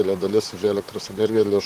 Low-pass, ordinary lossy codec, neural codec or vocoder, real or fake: 14.4 kHz; Opus, 16 kbps; vocoder, 44.1 kHz, 128 mel bands, Pupu-Vocoder; fake